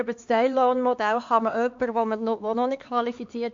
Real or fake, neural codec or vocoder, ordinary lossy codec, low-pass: fake; codec, 16 kHz, 4 kbps, X-Codec, HuBERT features, trained on LibriSpeech; MP3, 48 kbps; 7.2 kHz